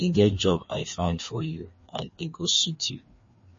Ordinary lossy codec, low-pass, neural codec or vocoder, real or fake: MP3, 32 kbps; 7.2 kHz; codec, 16 kHz, 2 kbps, FreqCodec, larger model; fake